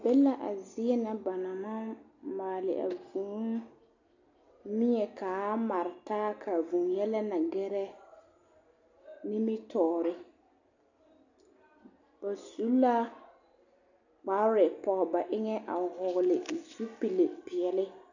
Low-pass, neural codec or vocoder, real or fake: 7.2 kHz; none; real